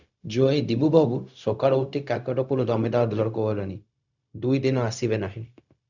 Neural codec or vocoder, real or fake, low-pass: codec, 16 kHz, 0.4 kbps, LongCat-Audio-Codec; fake; 7.2 kHz